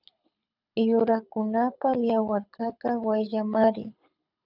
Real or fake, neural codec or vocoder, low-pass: fake; codec, 24 kHz, 6 kbps, HILCodec; 5.4 kHz